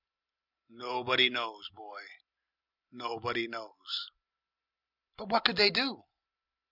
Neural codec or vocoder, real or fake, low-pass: none; real; 5.4 kHz